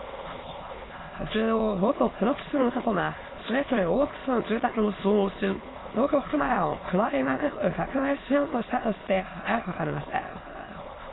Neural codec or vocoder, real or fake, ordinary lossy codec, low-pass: autoencoder, 22.05 kHz, a latent of 192 numbers a frame, VITS, trained on many speakers; fake; AAC, 16 kbps; 7.2 kHz